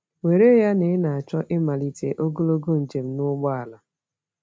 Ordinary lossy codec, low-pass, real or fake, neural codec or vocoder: none; none; real; none